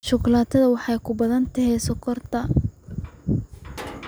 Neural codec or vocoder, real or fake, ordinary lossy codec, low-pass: none; real; none; none